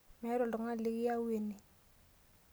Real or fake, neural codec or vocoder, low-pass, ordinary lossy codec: real; none; none; none